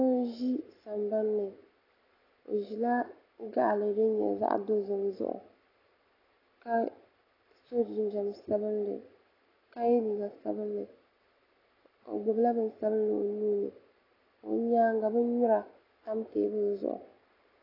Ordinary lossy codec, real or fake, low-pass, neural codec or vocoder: AAC, 24 kbps; real; 5.4 kHz; none